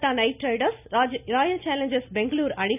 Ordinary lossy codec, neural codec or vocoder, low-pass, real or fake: none; none; 3.6 kHz; real